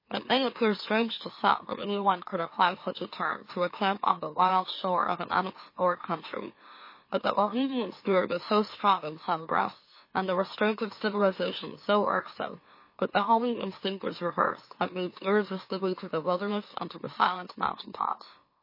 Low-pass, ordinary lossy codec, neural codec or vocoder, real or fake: 5.4 kHz; MP3, 24 kbps; autoencoder, 44.1 kHz, a latent of 192 numbers a frame, MeloTTS; fake